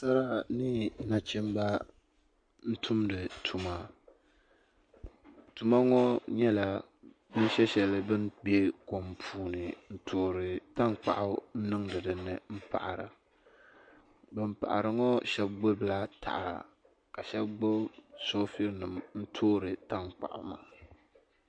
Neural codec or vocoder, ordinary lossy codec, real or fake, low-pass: none; MP3, 48 kbps; real; 9.9 kHz